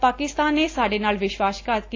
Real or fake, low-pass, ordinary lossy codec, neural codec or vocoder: real; 7.2 kHz; AAC, 32 kbps; none